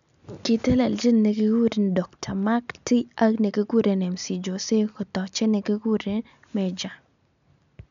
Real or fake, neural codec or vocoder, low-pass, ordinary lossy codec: real; none; 7.2 kHz; none